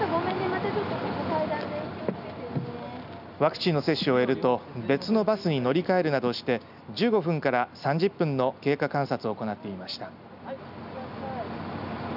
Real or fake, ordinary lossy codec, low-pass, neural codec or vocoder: real; none; 5.4 kHz; none